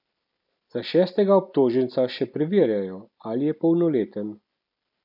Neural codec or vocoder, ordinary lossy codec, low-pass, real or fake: none; none; 5.4 kHz; real